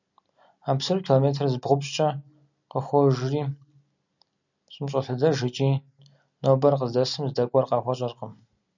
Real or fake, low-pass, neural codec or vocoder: real; 7.2 kHz; none